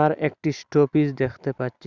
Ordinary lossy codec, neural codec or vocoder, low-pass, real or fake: Opus, 64 kbps; none; 7.2 kHz; real